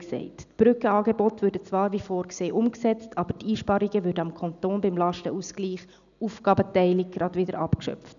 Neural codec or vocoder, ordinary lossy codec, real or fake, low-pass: none; none; real; 7.2 kHz